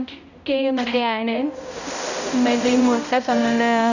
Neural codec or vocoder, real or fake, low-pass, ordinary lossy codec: codec, 16 kHz, 0.5 kbps, X-Codec, HuBERT features, trained on balanced general audio; fake; 7.2 kHz; none